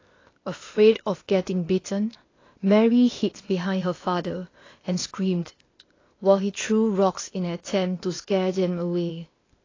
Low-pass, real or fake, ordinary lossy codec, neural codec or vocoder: 7.2 kHz; fake; AAC, 32 kbps; codec, 16 kHz, 0.8 kbps, ZipCodec